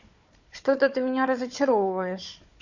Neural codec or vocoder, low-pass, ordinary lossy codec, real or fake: codec, 16 kHz, 4 kbps, FunCodec, trained on Chinese and English, 50 frames a second; 7.2 kHz; none; fake